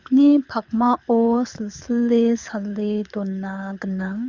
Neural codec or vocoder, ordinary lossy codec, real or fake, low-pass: codec, 24 kHz, 6 kbps, HILCodec; Opus, 64 kbps; fake; 7.2 kHz